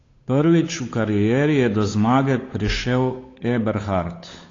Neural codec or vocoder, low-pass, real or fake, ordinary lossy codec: codec, 16 kHz, 8 kbps, FunCodec, trained on Chinese and English, 25 frames a second; 7.2 kHz; fake; AAC, 32 kbps